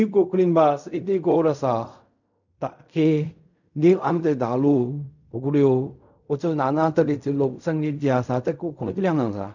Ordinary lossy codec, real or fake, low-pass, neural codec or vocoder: none; fake; 7.2 kHz; codec, 16 kHz in and 24 kHz out, 0.4 kbps, LongCat-Audio-Codec, fine tuned four codebook decoder